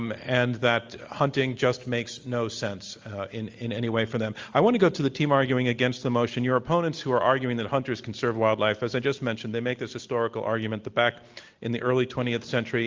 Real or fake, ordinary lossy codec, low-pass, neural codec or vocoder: real; Opus, 32 kbps; 7.2 kHz; none